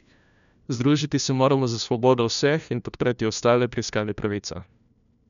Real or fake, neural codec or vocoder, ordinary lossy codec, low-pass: fake; codec, 16 kHz, 1 kbps, FunCodec, trained on LibriTTS, 50 frames a second; none; 7.2 kHz